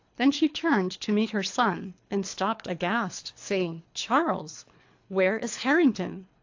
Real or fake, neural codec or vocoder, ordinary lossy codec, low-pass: fake; codec, 24 kHz, 3 kbps, HILCodec; AAC, 48 kbps; 7.2 kHz